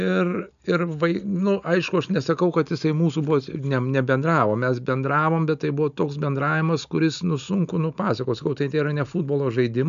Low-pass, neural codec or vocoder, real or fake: 7.2 kHz; none; real